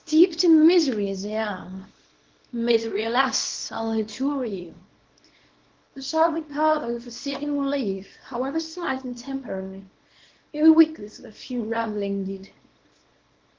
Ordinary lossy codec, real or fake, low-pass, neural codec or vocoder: Opus, 16 kbps; fake; 7.2 kHz; codec, 24 kHz, 0.9 kbps, WavTokenizer, small release